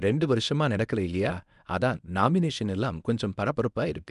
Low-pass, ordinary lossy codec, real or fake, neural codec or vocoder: 10.8 kHz; none; fake; codec, 24 kHz, 0.9 kbps, WavTokenizer, medium speech release version 1